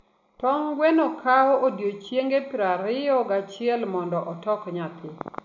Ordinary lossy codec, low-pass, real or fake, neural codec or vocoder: none; 7.2 kHz; real; none